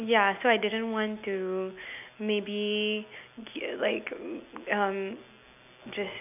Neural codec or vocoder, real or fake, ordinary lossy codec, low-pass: none; real; none; 3.6 kHz